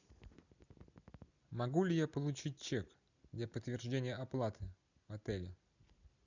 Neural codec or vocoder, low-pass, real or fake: none; 7.2 kHz; real